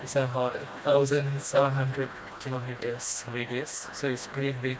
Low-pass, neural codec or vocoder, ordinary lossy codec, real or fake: none; codec, 16 kHz, 1 kbps, FreqCodec, smaller model; none; fake